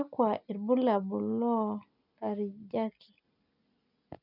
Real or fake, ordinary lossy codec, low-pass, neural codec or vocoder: real; none; 5.4 kHz; none